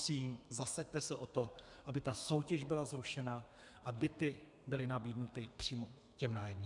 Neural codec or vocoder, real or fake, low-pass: codec, 32 kHz, 1.9 kbps, SNAC; fake; 10.8 kHz